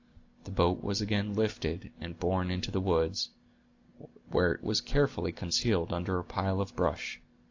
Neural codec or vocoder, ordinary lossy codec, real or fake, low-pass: none; AAC, 48 kbps; real; 7.2 kHz